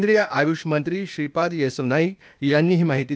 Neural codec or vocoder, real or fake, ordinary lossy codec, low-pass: codec, 16 kHz, 0.8 kbps, ZipCodec; fake; none; none